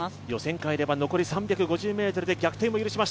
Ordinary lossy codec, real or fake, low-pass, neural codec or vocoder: none; real; none; none